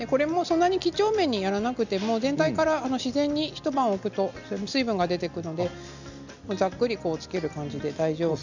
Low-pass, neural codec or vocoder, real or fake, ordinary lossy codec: 7.2 kHz; none; real; none